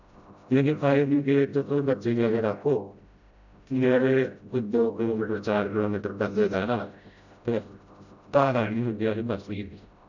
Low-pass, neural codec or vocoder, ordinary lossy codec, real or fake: 7.2 kHz; codec, 16 kHz, 0.5 kbps, FreqCodec, smaller model; none; fake